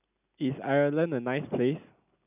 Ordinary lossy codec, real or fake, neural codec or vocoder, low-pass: none; real; none; 3.6 kHz